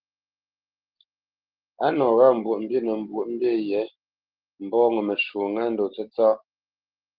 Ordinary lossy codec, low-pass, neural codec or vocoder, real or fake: Opus, 16 kbps; 5.4 kHz; none; real